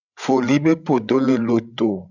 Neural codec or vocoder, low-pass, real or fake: codec, 16 kHz, 16 kbps, FreqCodec, larger model; 7.2 kHz; fake